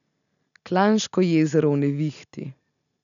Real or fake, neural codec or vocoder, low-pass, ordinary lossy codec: real; none; 7.2 kHz; none